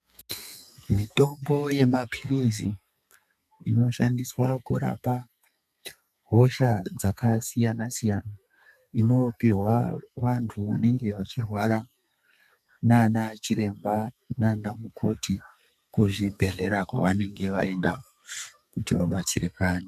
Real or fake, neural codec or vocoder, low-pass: fake; codec, 32 kHz, 1.9 kbps, SNAC; 14.4 kHz